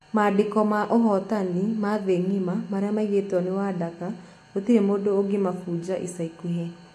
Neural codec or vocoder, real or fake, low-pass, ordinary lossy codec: none; real; 14.4 kHz; AAC, 64 kbps